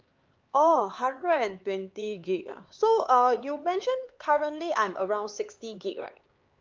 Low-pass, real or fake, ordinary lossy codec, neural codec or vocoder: 7.2 kHz; fake; Opus, 24 kbps; codec, 16 kHz, 4 kbps, X-Codec, HuBERT features, trained on LibriSpeech